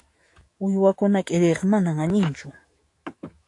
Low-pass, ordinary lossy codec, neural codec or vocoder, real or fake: 10.8 kHz; AAC, 48 kbps; autoencoder, 48 kHz, 128 numbers a frame, DAC-VAE, trained on Japanese speech; fake